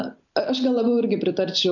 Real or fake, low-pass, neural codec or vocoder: real; 7.2 kHz; none